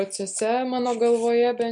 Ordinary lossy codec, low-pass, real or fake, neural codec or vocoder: MP3, 64 kbps; 9.9 kHz; real; none